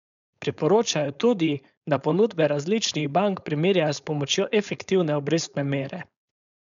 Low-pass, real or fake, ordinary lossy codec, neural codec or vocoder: 7.2 kHz; fake; none; codec, 16 kHz, 4.8 kbps, FACodec